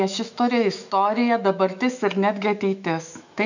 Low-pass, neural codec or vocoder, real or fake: 7.2 kHz; autoencoder, 48 kHz, 128 numbers a frame, DAC-VAE, trained on Japanese speech; fake